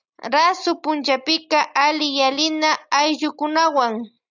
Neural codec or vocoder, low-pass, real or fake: none; 7.2 kHz; real